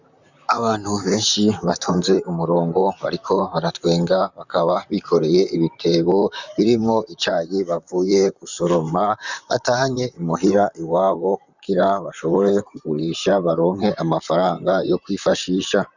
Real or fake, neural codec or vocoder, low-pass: fake; vocoder, 44.1 kHz, 128 mel bands, Pupu-Vocoder; 7.2 kHz